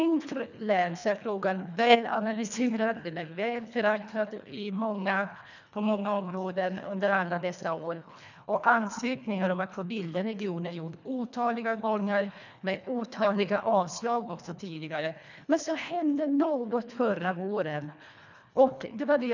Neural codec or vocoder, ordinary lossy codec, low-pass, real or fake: codec, 24 kHz, 1.5 kbps, HILCodec; none; 7.2 kHz; fake